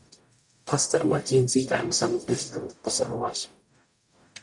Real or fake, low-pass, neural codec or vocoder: fake; 10.8 kHz; codec, 44.1 kHz, 0.9 kbps, DAC